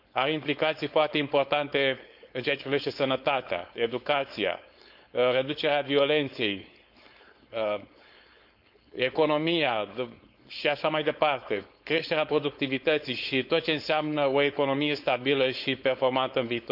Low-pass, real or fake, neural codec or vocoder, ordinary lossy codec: 5.4 kHz; fake; codec, 16 kHz, 4.8 kbps, FACodec; none